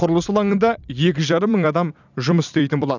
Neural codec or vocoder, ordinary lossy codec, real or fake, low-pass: vocoder, 44.1 kHz, 128 mel bands every 256 samples, BigVGAN v2; none; fake; 7.2 kHz